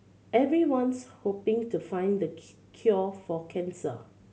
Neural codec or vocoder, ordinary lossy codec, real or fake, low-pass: none; none; real; none